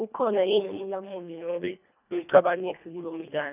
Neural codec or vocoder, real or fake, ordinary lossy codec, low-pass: codec, 24 kHz, 1.5 kbps, HILCodec; fake; none; 3.6 kHz